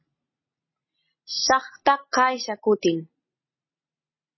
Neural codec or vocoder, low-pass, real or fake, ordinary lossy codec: none; 7.2 kHz; real; MP3, 24 kbps